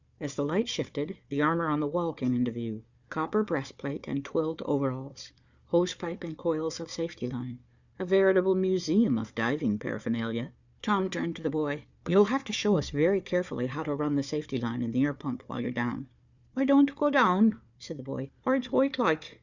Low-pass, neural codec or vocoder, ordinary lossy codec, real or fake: 7.2 kHz; codec, 16 kHz, 4 kbps, FunCodec, trained on Chinese and English, 50 frames a second; Opus, 64 kbps; fake